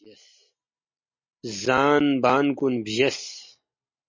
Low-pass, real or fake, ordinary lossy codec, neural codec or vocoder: 7.2 kHz; real; MP3, 32 kbps; none